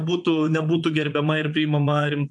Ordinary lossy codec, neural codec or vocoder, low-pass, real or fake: MP3, 64 kbps; vocoder, 22.05 kHz, 80 mel bands, Vocos; 9.9 kHz; fake